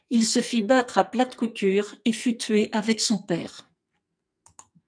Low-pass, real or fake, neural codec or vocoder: 9.9 kHz; fake; codec, 44.1 kHz, 2.6 kbps, SNAC